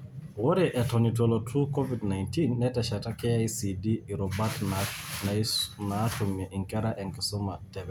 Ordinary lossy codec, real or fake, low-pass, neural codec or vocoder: none; real; none; none